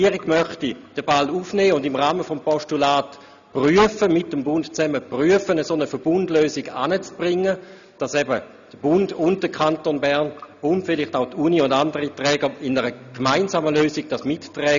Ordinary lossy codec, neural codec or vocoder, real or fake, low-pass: none; none; real; 7.2 kHz